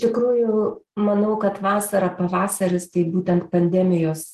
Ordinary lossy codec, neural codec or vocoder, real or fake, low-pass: Opus, 16 kbps; none; real; 14.4 kHz